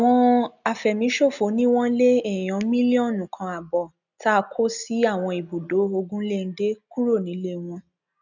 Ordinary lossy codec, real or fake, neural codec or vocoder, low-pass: none; real; none; 7.2 kHz